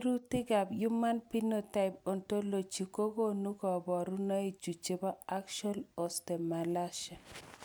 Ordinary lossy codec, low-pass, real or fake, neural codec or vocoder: none; none; real; none